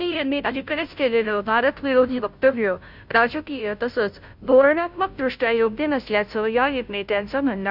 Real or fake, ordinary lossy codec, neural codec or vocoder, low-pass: fake; none; codec, 16 kHz, 0.5 kbps, FunCodec, trained on Chinese and English, 25 frames a second; 5.4 kHz